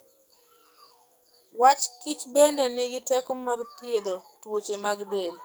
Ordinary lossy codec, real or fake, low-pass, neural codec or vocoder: none; fake; none; codec, 44.1 kHz, 2.6 kbps, SNAC